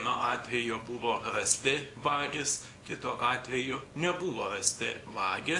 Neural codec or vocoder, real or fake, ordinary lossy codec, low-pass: codec, 24 kHz, 0.9 kbps, WavTokenizer, small release; fake; AAC, 48 kbps; 10.8 kHz